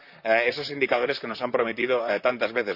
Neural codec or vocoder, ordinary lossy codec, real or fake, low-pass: vocoder, 44.1 kHz, 128 mel bands, Pupu-Vocoder; Opus, 64 kbps; fake; 5.4 kHz